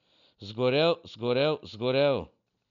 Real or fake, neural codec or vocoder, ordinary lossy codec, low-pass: real; none; none; 7.2 kHz